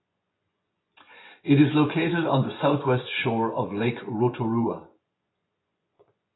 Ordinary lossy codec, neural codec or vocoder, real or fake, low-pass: AAC, 16 kbps; vocoder, 24 kHz, 100 mel bands, Vocos; fake; 7.2 kHz